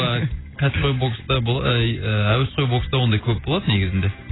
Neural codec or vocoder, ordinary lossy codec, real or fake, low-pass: none; AAC, 16 kbps; real; 7.2 kHz